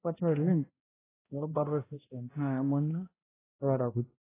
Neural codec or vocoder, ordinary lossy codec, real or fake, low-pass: codec, 16 kHz, 0.5 kbps, X-Codec, HuBERT features, trained on balanced general audio; AAC, 16 kbps; fake; 3.6 kHz